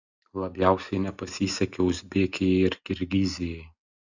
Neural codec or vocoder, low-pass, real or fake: none; 7.2 kHz; real